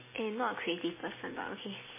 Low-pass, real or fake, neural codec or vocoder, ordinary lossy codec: 3.6 kHz; real; none; MP3, 16 kbps